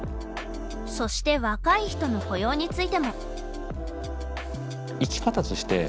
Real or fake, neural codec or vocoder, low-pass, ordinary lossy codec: real; none; none; none